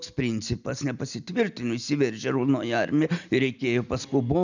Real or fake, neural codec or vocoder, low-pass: real; none; 7.2 kHz